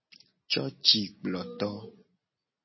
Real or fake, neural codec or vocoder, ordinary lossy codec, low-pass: real; none; MP3, 24 kbps; 7.2 kHz